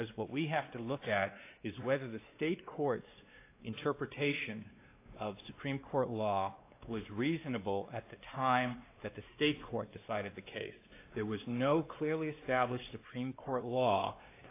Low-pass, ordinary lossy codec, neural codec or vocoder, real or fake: 3.6 kHz; AAC, 24 kbps; codec, 16 kHz, 2 kbps, X-Codec, WavLM features, trained on Multilingual LibriSpeech; fake